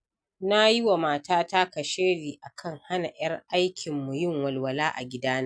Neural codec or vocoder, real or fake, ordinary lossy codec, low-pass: none; real; none; 9.9 kHz